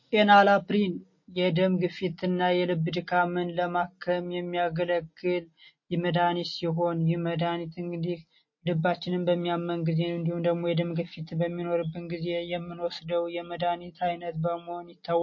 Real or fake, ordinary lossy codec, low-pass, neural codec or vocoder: real; MP3, 32 kbps; 7.2 kHz; none